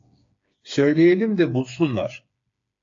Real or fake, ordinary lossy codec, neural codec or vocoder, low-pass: fake; AAC, 48 kbps; codec, 16 kHz, 4 kbps, FreqCodec, smaller model; 7.2 kHz